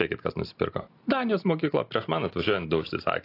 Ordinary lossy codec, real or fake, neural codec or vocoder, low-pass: AAC, 32 kbps; real; none; 5.4 kHz